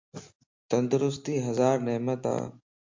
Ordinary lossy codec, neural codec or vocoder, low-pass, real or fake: MP3, 48 kbps; none; 7.2 kHz; real